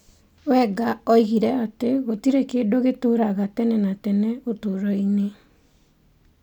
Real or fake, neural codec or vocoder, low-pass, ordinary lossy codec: real; none; 19.8 kHz; none